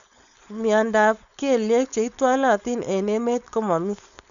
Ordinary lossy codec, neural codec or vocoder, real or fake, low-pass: MP3, 96 kbps; codec, 16 kHz, 4.8 kbps, FACodec; fake; 7.2 kHz